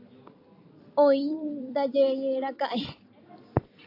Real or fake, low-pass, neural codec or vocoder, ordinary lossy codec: fake; 5.4 kHz; vocoder, 44.1 kHz, 128 mel bands every 256 samples, BigVGAN v2; AAC, 32 kbps